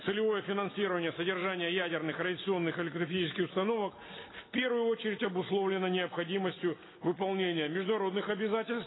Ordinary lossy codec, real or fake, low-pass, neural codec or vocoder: AAC, 16 kbps; real; 7.2 kHz; none